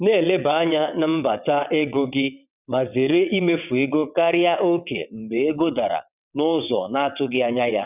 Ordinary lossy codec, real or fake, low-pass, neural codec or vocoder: none; fake; 3.6 kHz; vocoder, 22.05 kHz, 80 mel bands, WaveNeXt